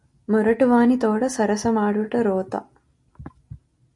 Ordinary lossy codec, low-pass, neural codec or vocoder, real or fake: MP3, 48 kbps; 10.8 kHz; none; real